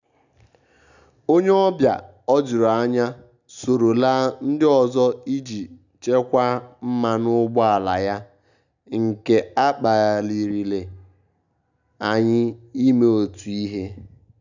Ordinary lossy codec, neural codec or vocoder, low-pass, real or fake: none; none; 7.2 kHz; real